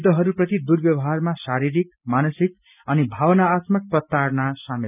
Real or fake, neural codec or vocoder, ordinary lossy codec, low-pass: real; none; none; 3.6 kHz